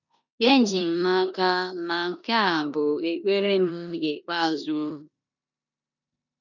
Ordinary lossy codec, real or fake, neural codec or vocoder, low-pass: none; fake; codec, 16 kHz in and 24 kHz out, 0.9 kbps, LongCat-Audio-Codec, four codebook decoder; 7.2 kHz